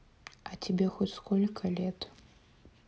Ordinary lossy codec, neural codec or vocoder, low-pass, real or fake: none; none; none; real